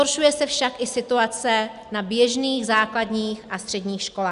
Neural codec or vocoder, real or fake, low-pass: none; real; 10.8 kHz